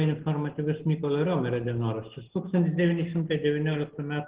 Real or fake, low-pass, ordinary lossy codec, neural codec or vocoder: real; 3.6 kHz; Opus, 16 kbps; none